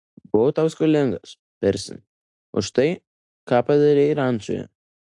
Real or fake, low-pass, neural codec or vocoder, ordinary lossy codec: real; 10.8 kHz; none; AAC, 64 kbps